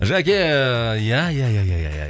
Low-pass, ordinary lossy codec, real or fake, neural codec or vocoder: none; none; real; none